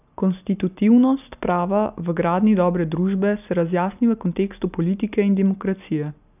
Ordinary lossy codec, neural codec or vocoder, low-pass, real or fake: none; none; 3.6 kHz; real